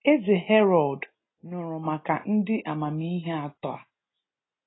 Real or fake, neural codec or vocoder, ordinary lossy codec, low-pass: real; none; AAC, 16 kbps; 7.2 kHz